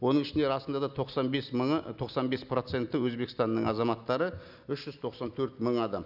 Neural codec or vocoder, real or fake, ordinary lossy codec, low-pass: none; real; none; 5.4 kHz